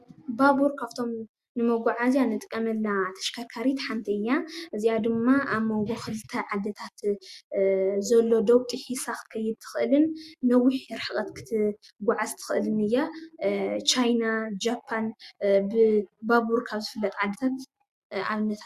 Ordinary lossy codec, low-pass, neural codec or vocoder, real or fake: Opus, 64 kbps; 14.4 kHz; none; real